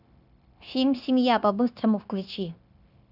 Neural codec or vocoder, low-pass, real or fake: codec, 16 kHz, 0.9 kbps, LongCat-Audio-Codec; 5.4 kHz; fake